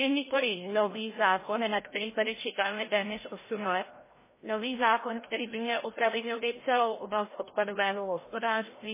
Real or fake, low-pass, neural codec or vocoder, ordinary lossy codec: fake; 3.6 kHz; codec, 16 kHz, 0.5 kbps, FreqCodec, larger model; MP3, 16 kbps